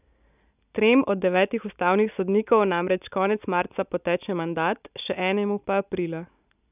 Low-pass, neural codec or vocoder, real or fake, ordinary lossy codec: 3.6 kHz; none; real; none